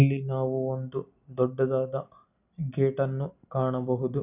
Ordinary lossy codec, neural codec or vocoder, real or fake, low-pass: none; none; real; 3.6 kHz